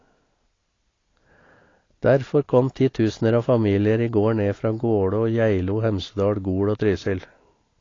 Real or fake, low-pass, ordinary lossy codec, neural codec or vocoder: real; 7.2 kHz; AAC, 32 kbps; none